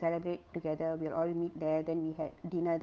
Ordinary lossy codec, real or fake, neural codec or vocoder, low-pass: none; fake; codec, 16 kHz, 8 kbps, FunCodec, trained on Chinese and English, 25 frames a second; none